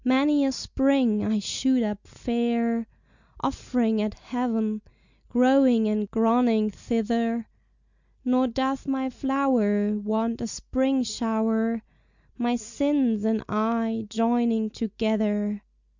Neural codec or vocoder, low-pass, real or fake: none; 7.2 kHz; real